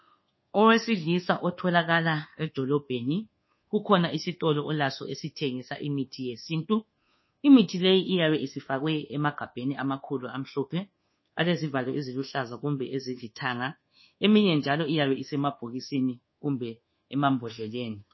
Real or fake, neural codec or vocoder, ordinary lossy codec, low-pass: fake; codec, 24 kHz, 1.2 kbps, DualCodec; MP3, 24 kbps; 7.2 kHz